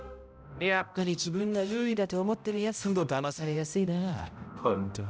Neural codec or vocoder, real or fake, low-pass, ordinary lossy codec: codec, 16 kHz, 0.5 kbps, X-Codec, HuBERT features, trained on balanced general audio; fake; none; none